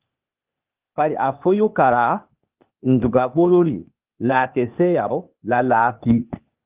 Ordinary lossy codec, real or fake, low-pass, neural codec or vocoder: Opus, 32 kbps; fake; 3.6 kHz; codec, 16 kHz, 0.8 kbps, ZipCodec